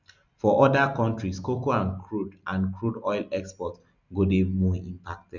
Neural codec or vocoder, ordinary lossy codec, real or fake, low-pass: none; none; real; 7.2 kHz